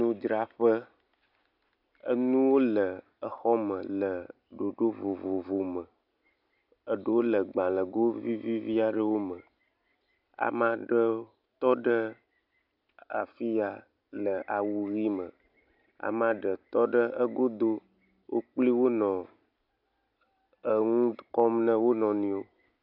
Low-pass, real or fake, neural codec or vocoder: 5.4 kHz; real; none